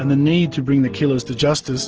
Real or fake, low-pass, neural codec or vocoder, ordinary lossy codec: real; 7.2 kHz; none; Opus, 16 kbps